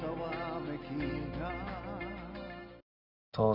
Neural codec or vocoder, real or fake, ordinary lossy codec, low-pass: none; real; none; 5.4 kHz